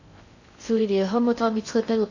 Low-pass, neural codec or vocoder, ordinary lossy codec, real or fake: 7.2 kHz; codec, 16 kHz in and 24 kHz out, 0.6 kbps, FocalCodec, streaming, 4096 codes; none; fake